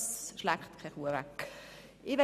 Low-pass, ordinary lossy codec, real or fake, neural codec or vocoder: 14.4 kHz; none; real; none